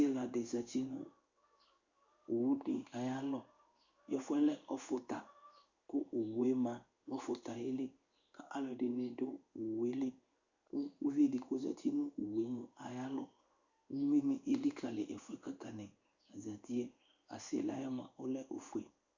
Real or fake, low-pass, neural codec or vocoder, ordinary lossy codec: fake; 7.2 kHz; codec, 16 kHz in and 24 kHz out, 1 kbps, XY-Tokenizer; Opus, 64 kbps